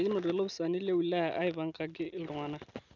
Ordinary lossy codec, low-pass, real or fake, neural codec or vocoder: none; 7.2 kHz; real; none